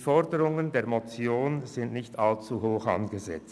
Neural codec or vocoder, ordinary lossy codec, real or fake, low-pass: none; none; real; none